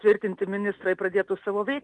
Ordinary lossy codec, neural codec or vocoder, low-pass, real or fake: Opus, 32 kbps; none; 10.8 kHz; real